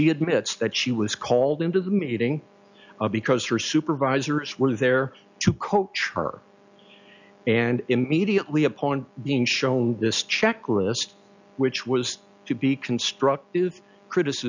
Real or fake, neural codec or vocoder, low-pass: real; none; 7.2 kHz